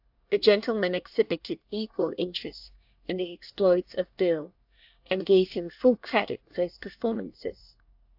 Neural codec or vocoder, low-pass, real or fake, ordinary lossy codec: codec, 24 kHz, 1 kbps, SNAC; 5.4 kHz; fake; AAC, 48 kbps